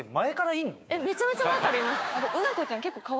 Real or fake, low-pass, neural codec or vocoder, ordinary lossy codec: fake; none; codec, 16 kHz, 6 kbps, DAC; none